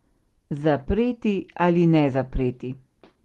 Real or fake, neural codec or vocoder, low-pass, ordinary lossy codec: real; none; 19.8 kHz; Opus, 16 kbps